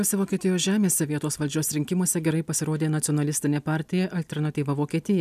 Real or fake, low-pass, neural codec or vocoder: real; 14.4 kHz; none